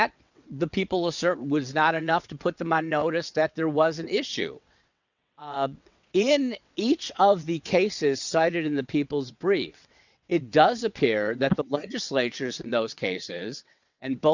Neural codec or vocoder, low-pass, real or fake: vocoder, 22.05 kHz, 80 mel bands, WaveNeXt; 7.2 kHz; fake